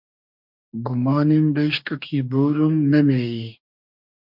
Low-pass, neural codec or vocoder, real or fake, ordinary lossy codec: 5.4 kHz; codec, 44.1 kHz, 2.6 kbps, DAC; fake; MP3, 48 kbps